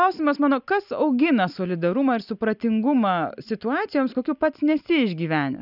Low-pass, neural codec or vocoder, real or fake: 5.4 kHz; none; real